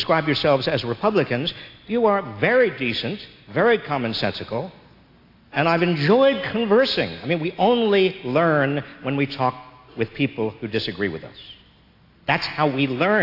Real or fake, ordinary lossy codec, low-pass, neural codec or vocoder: real; AAC, 32 kbps; 5.4 kHz; none